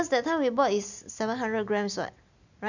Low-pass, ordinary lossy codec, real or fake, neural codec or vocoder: 7.2 kHz; none; real; none